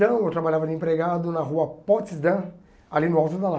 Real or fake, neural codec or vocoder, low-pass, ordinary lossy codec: real; none; none; none